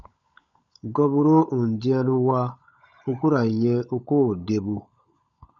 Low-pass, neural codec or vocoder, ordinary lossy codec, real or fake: 7.2 kHz; codec, 16 kHz, 16 kbps, FunCodec, trained on LibriTTS, 50 frames a second; MP3, 96 kbps; fake